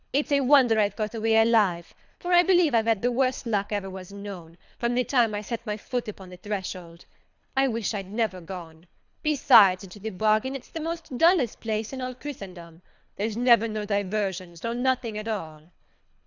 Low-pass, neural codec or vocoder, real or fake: 7.2 kHz; codec, 24 kHz, 3 kbps, HILCodec; fake